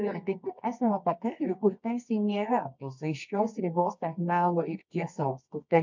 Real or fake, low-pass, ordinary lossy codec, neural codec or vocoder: fake; 7.2 kHz; MP3, 64 kbps; codec, 24 kHz, 0.9 kbps, WavTokenizer, medium music audio release